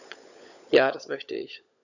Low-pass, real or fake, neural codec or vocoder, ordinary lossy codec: 7.2 kHz; fake; codec, 16 kHz, 16 kbps, FunCodec, trained on LibriTTS, 50 frames a second; none